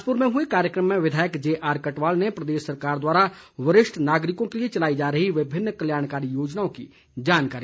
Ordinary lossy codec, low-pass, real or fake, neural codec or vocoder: none; none; real; none